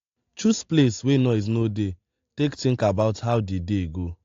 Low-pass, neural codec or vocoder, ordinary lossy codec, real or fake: 7.2 kHz; none; AAC, 48 kbps; real